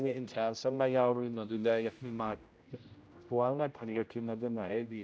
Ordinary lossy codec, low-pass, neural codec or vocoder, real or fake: none; none; codec, 16 kHz, 0.5 kbps, X-Codec, HuBERT features, trained on general audio; fake